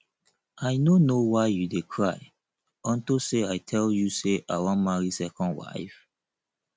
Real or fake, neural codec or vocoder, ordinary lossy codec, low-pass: real; none; none; none